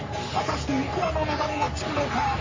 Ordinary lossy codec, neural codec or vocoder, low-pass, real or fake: MP3, 32 kbps; codec, 44.1 kHz, 3.4 kbps, Pupu-Codec; 7.2 kHz; fake